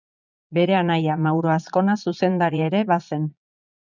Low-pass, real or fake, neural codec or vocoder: 7.2 kHz; fake; vocoder, 22.05 kHz, 80 mel bands, Vocos